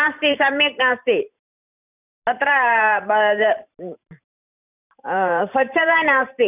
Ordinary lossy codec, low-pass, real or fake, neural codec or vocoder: none; 3.6 kHz; fake; vocoder, 44.1 kHz, 128 mel bands every 512 samples, BigVGAN v2